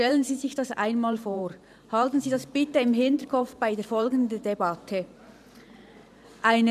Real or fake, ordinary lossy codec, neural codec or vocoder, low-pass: fake; none; vocoder, 44.1 kHz, 128 mel bands every 512 samples, BigVGAN v2; 14.4 kHz